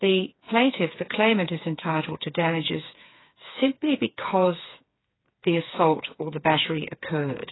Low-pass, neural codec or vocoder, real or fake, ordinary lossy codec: 7.2 kHz; codec, 16 kHz, 4 kbps, FreqCodec, smaller model; fake; AAC, 16 kbps